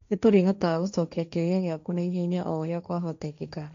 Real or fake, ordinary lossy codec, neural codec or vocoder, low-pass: fake; MP3, 64 kbps; codec, 16 kHz, 1.1 kbps, Voila-Tokenizer; 7.2 kHz